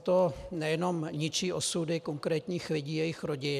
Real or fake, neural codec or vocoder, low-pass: real; none; 14.4 kHz